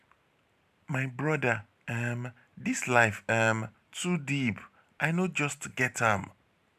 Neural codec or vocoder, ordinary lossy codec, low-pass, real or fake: vocoder, 44.1 kHz, 128 mel bands every 512 samples, BigVGAN v2; none; 14.4 kHz; fake